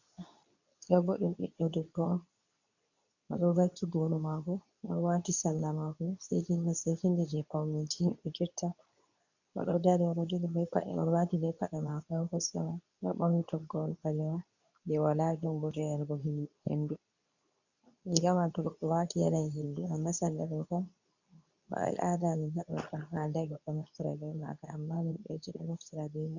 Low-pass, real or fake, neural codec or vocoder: 7.2 kHz; fake; codec, 24 kHz, 0.9 kbps, WavTokenizer, medium speech release version 2